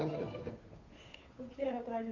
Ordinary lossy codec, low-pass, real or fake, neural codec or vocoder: AAC, 32 kbps; 7.2 kHz; fake; codec, 16 kHz, 2 kbps, FunCodec, trained on Chinese and English, 25 frames a second